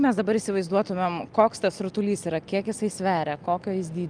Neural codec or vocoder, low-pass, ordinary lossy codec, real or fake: none; 9.9 kHz; Opus, 32 kbps; real